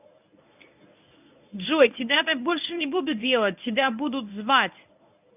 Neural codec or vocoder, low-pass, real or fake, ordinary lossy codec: codec, 24 kHz, 0.9 kbps, WavTokenizer, medium speech release version 1; 3.6 kHz; fake; none